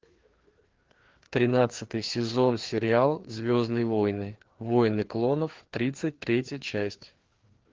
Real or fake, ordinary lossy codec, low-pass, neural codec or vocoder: fake; Opus, 16 kbps; 7.2 kHz; codec, 16 kHz, 2 kbps, FreqCodec, larger model